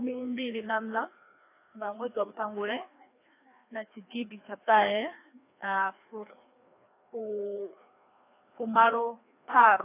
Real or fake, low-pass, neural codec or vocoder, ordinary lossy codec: fake; 3.6 kHz; codec, 16 kHz, 2 kbps, FreqCodec, larger model; AAC, 24 kbps